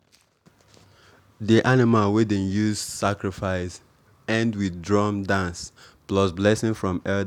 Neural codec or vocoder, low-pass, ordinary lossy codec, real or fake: none; 19.8 kHz; none; real